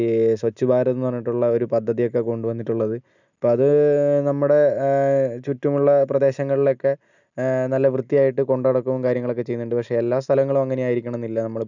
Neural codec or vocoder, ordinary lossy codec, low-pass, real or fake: none; none; 7.2 kHz; real